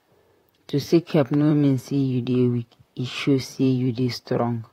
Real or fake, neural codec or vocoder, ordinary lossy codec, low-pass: fake; vocoder, 44.1 kHz, 128 mel bands, Pupu-Vocoder; AAC, 48 kbps; 19.8 kHz